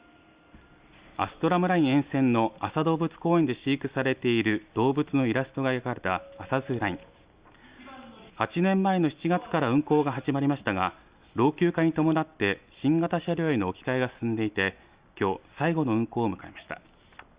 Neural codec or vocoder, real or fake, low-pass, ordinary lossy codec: none; real; 3.6 kHz; Opus, 64 kbps